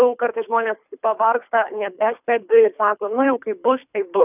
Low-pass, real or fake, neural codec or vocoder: 3.6 kHz; fake; codec, 24 kHz, 3 kbps, HILCodec